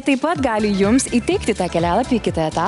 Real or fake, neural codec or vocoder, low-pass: real; none; 10.8 kHz